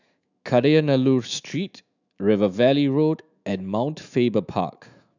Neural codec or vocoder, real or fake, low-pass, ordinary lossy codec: autoencoder, 48 kHz, 128 numbers a frame, DAC-VAE, trained on Japanese speech; fake; 7.2 kHz; none